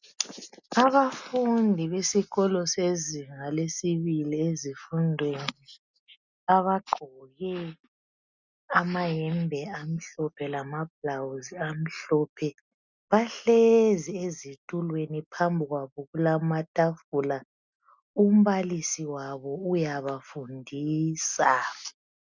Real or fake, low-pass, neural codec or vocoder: real; 7.2 kHz; none